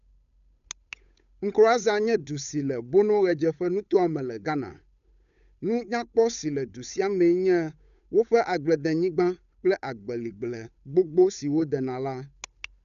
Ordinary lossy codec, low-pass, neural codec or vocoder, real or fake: none; 7.2 kHz; codec, 16 kHz, 8 kbps, FunCodec, trained on Chinese and English, 25 frames a second; fake